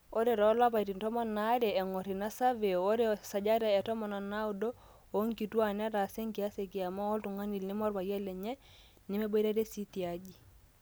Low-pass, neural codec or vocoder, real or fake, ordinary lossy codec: none; none; real; none